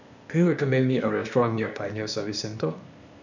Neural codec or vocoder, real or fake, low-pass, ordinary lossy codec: codec, 16 kHz, 0.8 kbps, ZipCodec; fake; 7.2 kHz; none